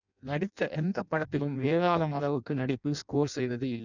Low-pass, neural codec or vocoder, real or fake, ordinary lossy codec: 7.2 kHz; codec, 16 kHz in and 24 kHz out, 0.6 kbps, FireRedTTS-2 codec; fake; none